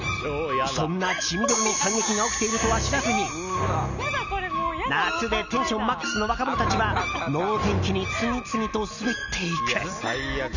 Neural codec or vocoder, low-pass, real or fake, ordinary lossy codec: none; 7.2 kHz; real; none